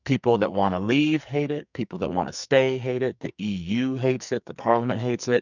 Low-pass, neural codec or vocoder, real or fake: 7.2 kHz; codec, 44.1 kHz, 2.6 kbps, SNAC; fake